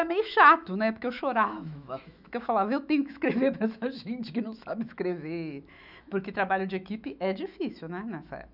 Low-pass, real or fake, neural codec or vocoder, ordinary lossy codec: 5.4 kHz; fake; autoencoder, 48 kHz, 128 numbers a frame, DAC-VAE, trained on Japanese speech; none